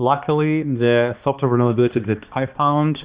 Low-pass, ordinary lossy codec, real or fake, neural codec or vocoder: 3.6 kHz; Opus, 64 kbps; fake; codec, 16 kHz, 2 kbps, X-Codec, HuBERT features, trained on balanced general audio